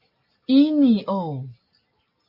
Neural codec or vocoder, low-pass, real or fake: none; 5.4 kHz; real